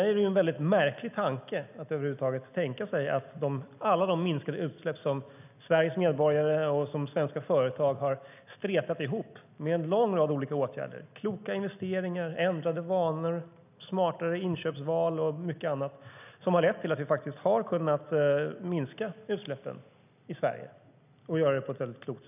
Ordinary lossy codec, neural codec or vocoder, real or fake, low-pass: none; none; real; 3.6 kHz